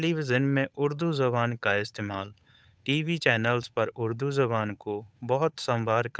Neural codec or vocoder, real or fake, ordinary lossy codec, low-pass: codec, 16 kHz, 8 kbps, FunCodec, trained on Chinese and English, 25 frames a second; fake; none; none